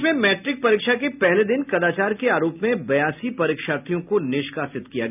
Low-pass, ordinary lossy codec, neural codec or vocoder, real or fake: 3.6 kHz; none; none; real